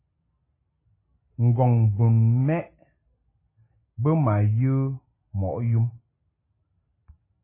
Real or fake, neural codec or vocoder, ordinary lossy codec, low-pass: real; none; MP3, 16 kbps; 3.6 kHz